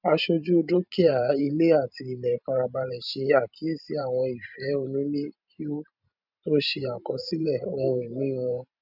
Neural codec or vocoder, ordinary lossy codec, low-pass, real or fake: vocoder, 44.1 kHz, 128 mel bands every 512 samples, BigVGAN v2; none; 5.4 kHz; fake